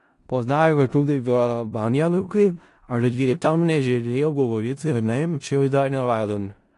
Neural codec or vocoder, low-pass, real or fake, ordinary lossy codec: codec, 16 kHz in and 24 kHz out, 0.4 kbps, LongCat-Audio-Codec, four codebook decoder; 10.8 kHz; fake; AAC, 48 kbps